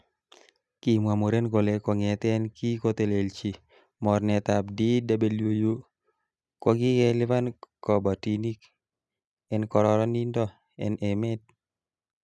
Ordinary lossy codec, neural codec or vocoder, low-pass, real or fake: none; none; none; real